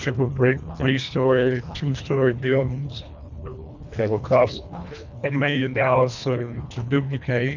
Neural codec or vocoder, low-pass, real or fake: codec, 24 kHz, 1.5 kbps, HILCodec; 7.2 kHz; fake